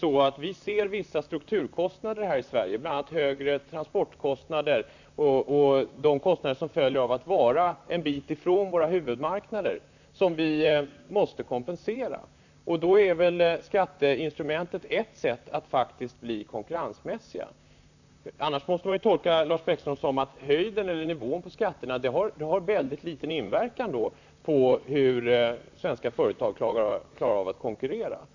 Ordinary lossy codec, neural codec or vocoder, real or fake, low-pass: none; vocoder, 44.1 kHz, 128 mel bands, Pupu-Vocoder; fake; 7.2 kHz